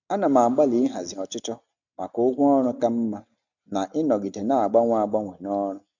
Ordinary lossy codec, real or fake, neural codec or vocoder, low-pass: none; real; none; 7.2 kHz